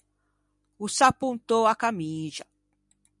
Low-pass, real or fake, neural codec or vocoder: 10.8 kHz; real; none